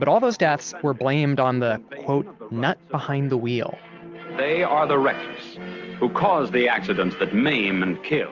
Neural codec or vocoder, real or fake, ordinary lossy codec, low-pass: none; real; Opus, 32 kbps; 7.2 kHz